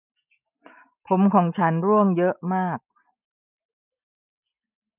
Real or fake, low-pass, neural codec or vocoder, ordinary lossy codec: real; 3.6 kHz; none; none